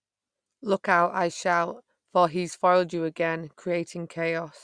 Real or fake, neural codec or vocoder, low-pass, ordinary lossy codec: real; none; 9.9 kHz; Opus, 64 kbps